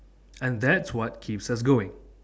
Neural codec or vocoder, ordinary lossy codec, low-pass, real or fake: none; none; none; real